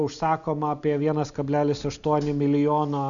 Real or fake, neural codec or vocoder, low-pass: real; none; 7.2 kHz